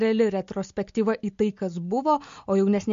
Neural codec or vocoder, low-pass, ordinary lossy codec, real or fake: none; 7.2 kHz; MP3, 48 kbps; real